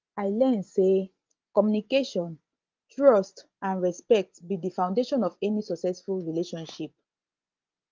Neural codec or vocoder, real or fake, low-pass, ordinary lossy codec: vocoder, 24 kHz, 100 mel bands, Vocos; fake; 7.2 kHz; Opus, 32 kbps